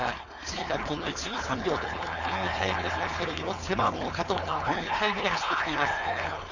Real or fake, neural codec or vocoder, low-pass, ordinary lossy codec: fake; codec, 16 kHz, 4.8 kbps, FACodec; 7.2 kHz; none